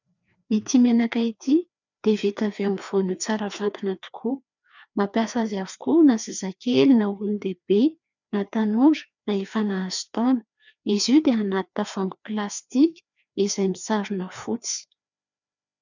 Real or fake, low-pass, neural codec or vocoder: fake; 7.2 kHz; codec, 16 kHz, 2 kbps, FreqCodec, larger model